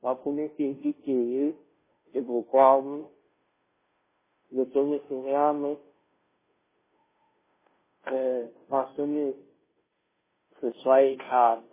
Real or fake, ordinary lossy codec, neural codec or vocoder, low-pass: fake; MP3, 16 kbps; codec, 16 kHz, 0.5 kbps, FunCodec, trained on Chinese and English, 25 frames a second; 3.6 kHz